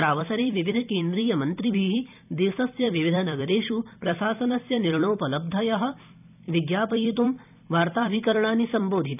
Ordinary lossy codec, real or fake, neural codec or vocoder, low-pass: none; fake; vocoder, 44.1 kHz, 128 mel bands every 256 samples, BigVGAN v2; 3.6 kHz